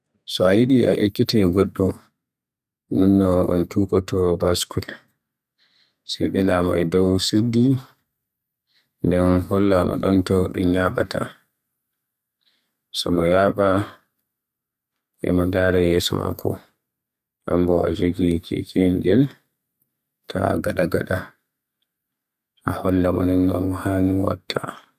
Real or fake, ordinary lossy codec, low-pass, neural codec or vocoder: fake; none; 14.4 kHz; codec, 32 kHz, 1.9 kbps, SNAC